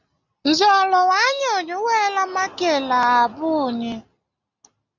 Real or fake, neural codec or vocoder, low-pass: real; none; 7.2 kHz